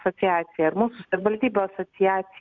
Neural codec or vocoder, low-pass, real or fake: none; 7.2 kHz; real